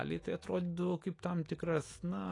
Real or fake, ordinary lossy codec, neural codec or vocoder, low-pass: fake; AAC, 32 kbps; autoencoder, 48 kHz, 128 numbers a frame, DAC-VAE, trained on Japanese speech; 10.8 kHz